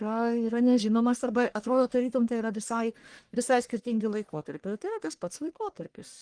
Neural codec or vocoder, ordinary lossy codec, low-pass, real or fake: codec, 44.1 kHz, 1.7 kbps, Pupu-Codec; Opus, 24 kbps; 9.9 kHz; fake